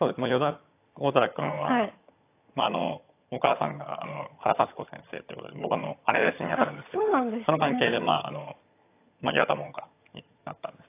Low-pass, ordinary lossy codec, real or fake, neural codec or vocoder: 3.6 kHz; AAC, 24 kbps; fake; vocoder, 22.05 kHz, 80 mel bands, HiFi-GAN